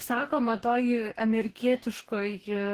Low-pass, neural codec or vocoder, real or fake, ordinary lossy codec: 14.4 kHz; codec, 44.1 kHz, 2.6 kbps, DAC; fake; Opus, 16 kbps